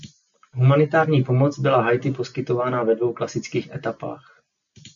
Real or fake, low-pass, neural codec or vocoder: real; 7.2 kHz; none